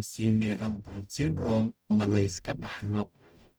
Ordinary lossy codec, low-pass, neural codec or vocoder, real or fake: none; none; codec, 44.1 kHz, 0.9 kbps, DAC; fake